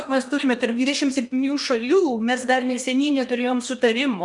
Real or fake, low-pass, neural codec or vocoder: fake; 10.8 kHz; codec, 16 kHz in and 24 kHz out, 0.8 kbps, FocalCodec, streaming, 65536 codes